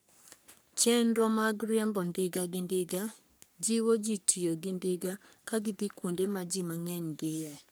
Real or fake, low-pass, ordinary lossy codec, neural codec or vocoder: fake; none; none; codec, 44.1 kHz, 3.4 kbps, Pupu-Codec